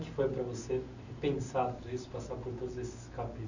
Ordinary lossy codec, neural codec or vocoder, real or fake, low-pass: none; none; real; 7.2 kHz